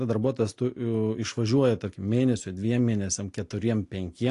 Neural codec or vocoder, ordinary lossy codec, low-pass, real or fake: none; AAC, 48 kbps; 10.8 kHz; real